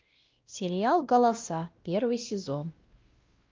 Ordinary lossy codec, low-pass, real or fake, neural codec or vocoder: Opus, 32 kbps; 7.2 kHz; fake; codec, 16 kHz, 1 kbps, X-Codec, WavLM features, trained on Multilingual LibriSpeech